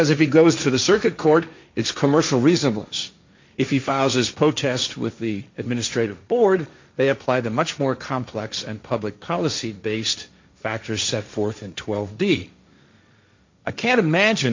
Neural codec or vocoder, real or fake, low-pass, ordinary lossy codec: codec, 16 kHz, 1.1 kbps, Voila-Tokenizer; fake; 7.2 kHz; MP3, 64 kbps